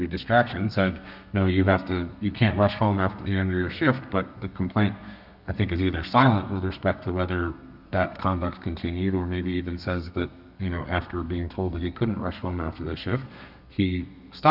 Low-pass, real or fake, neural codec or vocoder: 5.4 kHz; fake; codec, 32 kHz, 1.9 kbps, SNAC